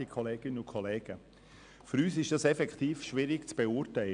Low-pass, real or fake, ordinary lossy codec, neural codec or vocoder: 9.9 kHz; real; none; none